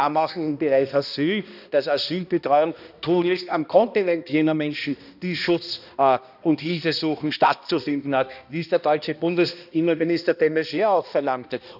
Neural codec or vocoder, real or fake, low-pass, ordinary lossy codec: codec, 16 kHz, 1 kbps, X-Codec, HuBERT features, trained on balanced general audio; fake; 5.4 kHz; none